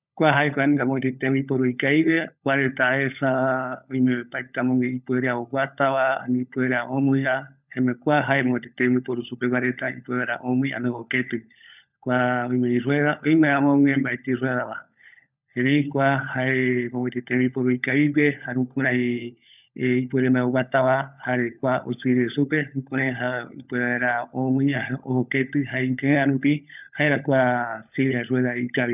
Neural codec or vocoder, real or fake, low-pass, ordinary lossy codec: codec, 16 kHz, 16 kbps, FunCodec, trained on LibriTTS, 50 frames a second; fake; 3.6 kHz; none